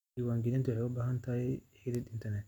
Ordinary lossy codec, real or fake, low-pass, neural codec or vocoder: none; real; 19.8 kHz; none